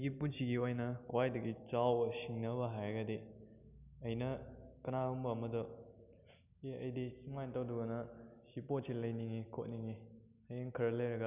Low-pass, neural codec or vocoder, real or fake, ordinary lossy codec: 3.6 kHz; none; real; none